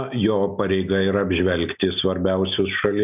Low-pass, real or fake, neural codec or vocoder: 3.6 kHz; real; none